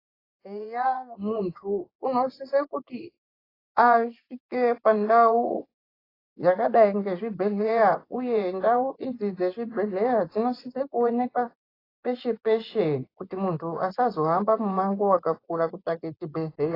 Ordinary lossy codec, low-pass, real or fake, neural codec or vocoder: AAC, 24 kbps; 5.4 kHz; fake; vocoder, 24 kHz, 100 mel bands, Vocos